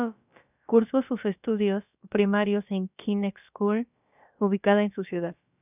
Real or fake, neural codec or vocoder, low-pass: fake; codec, 16 kHz, about 1 kbps, DyCAST, with the encoder's durations; 3.6 kHz